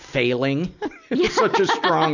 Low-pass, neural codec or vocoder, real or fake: 7.2 kHz; none; real